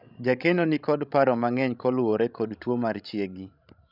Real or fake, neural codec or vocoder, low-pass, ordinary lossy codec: fake; codec, 16 kHz, 16 kbps, FreqCodec, larger model; 5.4 kHz; none